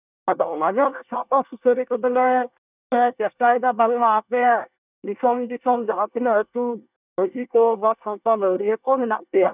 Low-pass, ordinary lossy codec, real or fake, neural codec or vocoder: 3.6 kHz; none; fake; codec, 24 kHz, 1 kbps, SNAC